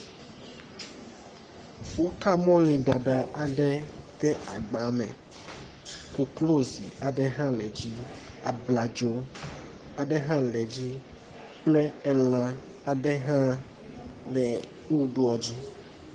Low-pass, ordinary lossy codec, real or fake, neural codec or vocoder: 9.9 kHz; Opus, 24 kbps; fake; codec, 44.1 kHz, 3.4 kbps, Pupu-Codec